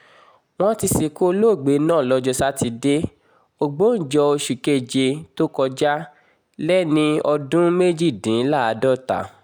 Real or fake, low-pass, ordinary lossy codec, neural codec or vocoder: real; none; none; none